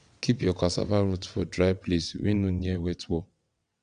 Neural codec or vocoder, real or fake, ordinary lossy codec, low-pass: vocoder, 22.05 kHz, 80 mel bands, WaveNeXt; fake; none; 9.9 kHz